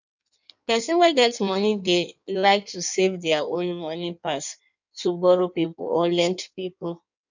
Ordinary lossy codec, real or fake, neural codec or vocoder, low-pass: none; fake; codec, 16 kHz in and 24 kHz out, 1.1 kbps, FireRedTTS-2 codec; 7.2 kHz